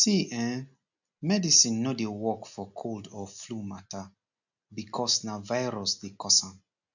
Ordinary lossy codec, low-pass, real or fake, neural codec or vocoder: none; 7.2 kHz; real; none